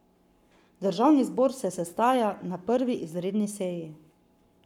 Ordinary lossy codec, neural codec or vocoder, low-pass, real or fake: none; codec, 44.1 kHz, 7.8 kbps, Pupu-Codec; 19.8 kHz; fake